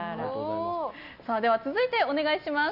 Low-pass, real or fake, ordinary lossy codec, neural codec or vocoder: 5.4 kHz; real; none; none